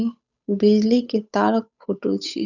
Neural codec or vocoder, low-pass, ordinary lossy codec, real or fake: codec, 16 kHz, 8 kbps, FunCodec, trained on Chinese and English, 25 frames a second; 7.2 kHz; none; fake